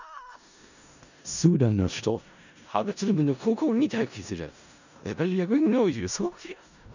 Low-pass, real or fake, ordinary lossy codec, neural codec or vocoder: 7.2 kHz; fake; none; codec, 16 kHz in and 24 kHz out, 0.4 kbps, LongCat-Audio-Codec, four codebook decoder